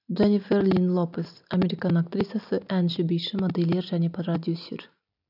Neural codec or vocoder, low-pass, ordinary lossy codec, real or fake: none; 5.4 kHz; none; real